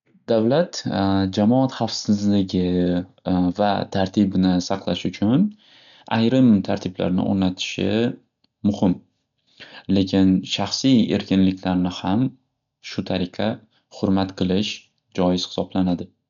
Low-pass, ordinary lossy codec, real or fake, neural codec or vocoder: 7.2 kHz; none; real; none